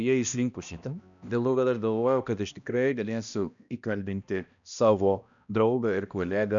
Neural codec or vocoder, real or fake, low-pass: codec, 16 kHz, 1 kbps, X-Codec, HuBERT features, trained on balanced general audio; fake; 7.2 kHz